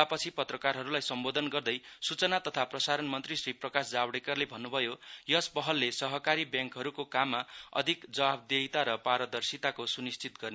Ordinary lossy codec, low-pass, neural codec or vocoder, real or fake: none; none; none; real